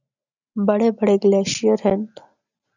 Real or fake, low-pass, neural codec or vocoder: real; 7.2 kHz; none